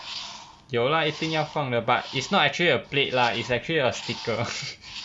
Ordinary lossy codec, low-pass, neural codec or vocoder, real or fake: none; none; none; real